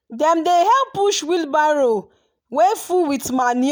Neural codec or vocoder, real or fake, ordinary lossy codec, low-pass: none; real; none; none